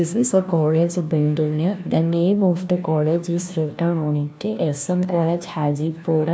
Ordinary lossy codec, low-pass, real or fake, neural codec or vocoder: none; none; fake; codec, 16 kHz, 1 kbps, FunCodec, trained on LibriTTS, 50 frames a second